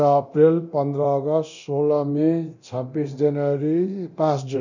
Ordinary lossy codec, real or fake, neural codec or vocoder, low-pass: none; fake; codec, 24 kHz, 0.9 kbps, DualCodec; 7.2 kHz